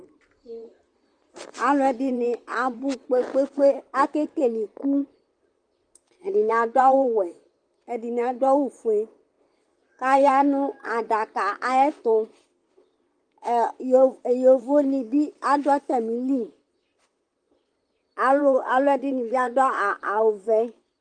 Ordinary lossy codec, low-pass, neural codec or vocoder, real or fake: Opus, 32 kbps; 9.9 kHz; vocoder, 44.1 kHz, 128 mel bands, Pupu-Vocoder; fake